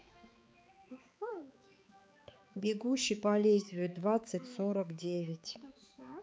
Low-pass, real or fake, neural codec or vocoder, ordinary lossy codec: none; fake; codec, 16 kHz, 4 kbps, X-Codec, HuBERT features, trained on balanced general audio; none